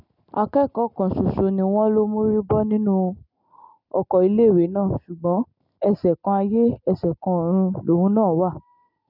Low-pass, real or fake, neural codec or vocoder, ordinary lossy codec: 5.4 kHz; real; none; none